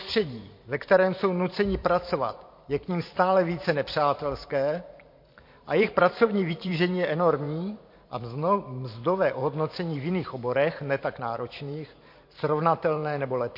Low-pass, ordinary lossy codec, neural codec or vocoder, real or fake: 5.4 kHz; MP3, 32 kbps; vocoder, 44.1 kHz, 128 mel bands every 512 samples, BigVGAN v2; fake